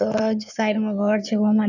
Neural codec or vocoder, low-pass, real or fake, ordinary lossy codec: codec, 16 kHz in and 24 kHz out, 2.2 kbps, FireRedTTS-2 codec; 7.2 kHz; fake; none